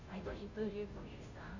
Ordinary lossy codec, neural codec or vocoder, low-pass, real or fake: none; codec, 16 kHz, 0.5 kbps, FunCodec, trained on Chinese and English, 25 frames a second; 7.2 kHz; fake